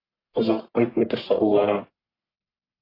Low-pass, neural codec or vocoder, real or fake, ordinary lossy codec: 5.4 kHz; codec, 44.1 kHz, 1.7 kbps, Pupu-Codec; fake; AAC, 24 kbps